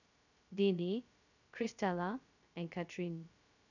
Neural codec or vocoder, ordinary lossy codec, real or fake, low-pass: codec, 16 kHz, 0.2 kbps, FocalCodec; none; fake; 7.2 kHz